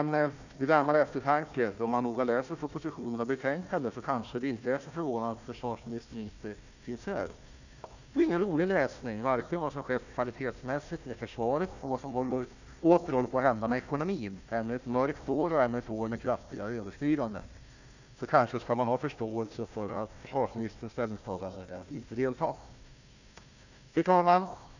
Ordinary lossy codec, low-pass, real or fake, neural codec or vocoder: none; 7.2 kHz; fake; codec, 16 kHz, 1 kbps, FunCodec, trained on Chinese and English, 50 frames a second